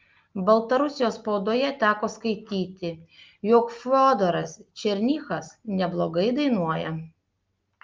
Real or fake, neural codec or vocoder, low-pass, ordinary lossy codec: real; none; 7.2 kHz; Opus, 24 kbps